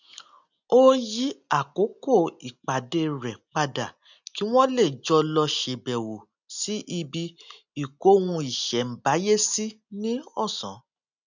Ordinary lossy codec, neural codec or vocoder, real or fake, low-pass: none; none; real; 7.2 kHz